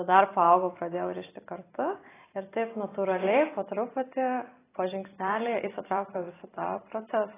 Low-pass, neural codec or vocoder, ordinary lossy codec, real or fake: 3.6 kHz; vocoder, 44.1 kHz, 128 mel bands every 256 samples, BigVGAN v2; AAC, 16 kbps; fake